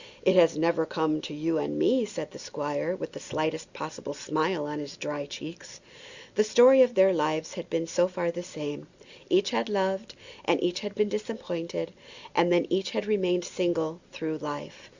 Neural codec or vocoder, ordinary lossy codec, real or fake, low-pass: none; Opus, 64 kbps; real; 7.2 kHz